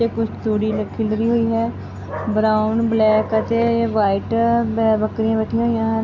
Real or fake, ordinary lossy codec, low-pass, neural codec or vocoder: real; none; 7.2 kHz; none